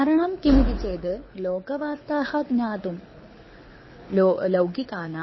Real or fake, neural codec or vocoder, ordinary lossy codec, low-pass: fake; codec, 24 kHz, 6 kbps, HILCodec; MP3, 24 kbps; 7.2 kHz